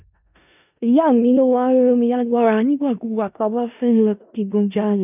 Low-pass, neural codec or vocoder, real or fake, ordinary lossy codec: 3.6 kHz; codec, 16 kHz in and 24 kHz out, 0.4 kbps, LongCat-Audio-Codec, four codebook decoder; fake; none